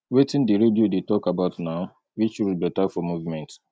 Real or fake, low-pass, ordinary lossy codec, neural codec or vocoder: real; none; none; none